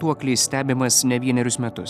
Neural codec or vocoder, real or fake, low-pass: none; real; 14.4 kHz